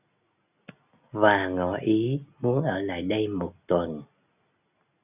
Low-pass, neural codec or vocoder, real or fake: 3.6 kHz; none; real